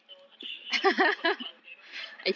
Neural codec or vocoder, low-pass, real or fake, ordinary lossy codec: none; none; real; none